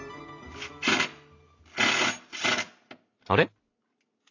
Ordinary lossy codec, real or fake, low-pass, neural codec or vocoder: AAC, 48 kbps; real; 7.2 kHz; none